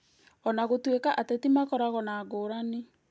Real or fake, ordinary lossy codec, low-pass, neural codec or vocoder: real; none; none; none